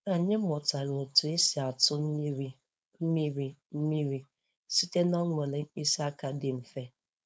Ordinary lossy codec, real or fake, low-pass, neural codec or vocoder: none; fake; none; codec, 16 kHz, 4.8 kbps, FACodec